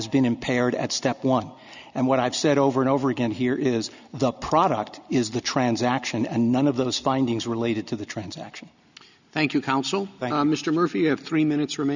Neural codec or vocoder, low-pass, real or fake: none; 7.2 kHz; real